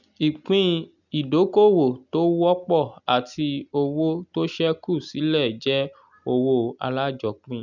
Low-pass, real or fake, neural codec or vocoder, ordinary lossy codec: 7.2 kHz; real; none; none